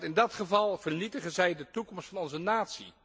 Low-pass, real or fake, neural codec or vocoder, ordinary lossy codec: none; real; none; none